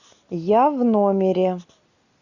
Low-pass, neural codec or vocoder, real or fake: 7.2 kHz; none; real